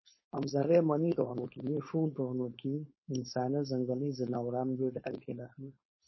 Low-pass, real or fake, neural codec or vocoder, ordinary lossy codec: 7.2 kHz; fake; codec, 16 kHz, 4.8 kbps, FACodec; MP3, 24 kbps